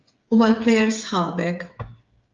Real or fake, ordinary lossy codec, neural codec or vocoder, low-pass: fake; Opus, 24 kbps; codec, 16 kHz, 8 kbps, FreqCodec, smaller model; 7.2 kHz